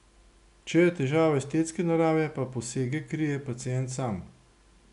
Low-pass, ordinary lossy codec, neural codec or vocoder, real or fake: 10.8 kHz; none; none; real